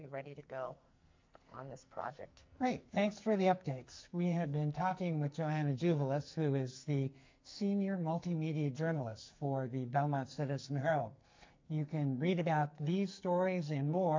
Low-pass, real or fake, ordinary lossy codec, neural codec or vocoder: 7.2 kHz; fake; MP3, 48 kbps; codec, 44.1 kHz, 2.6 kbps, SNAC